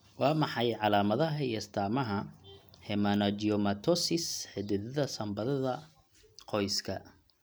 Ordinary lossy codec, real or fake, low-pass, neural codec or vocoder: none; real; none; none